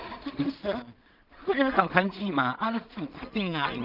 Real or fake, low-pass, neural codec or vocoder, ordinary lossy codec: fake; 5.4 kHz; codec, 16 kHz in and 24 kHz out, 0.4 kbps, LongCat-Audio-Codec, two codebook decoder; Opus, 32 kbps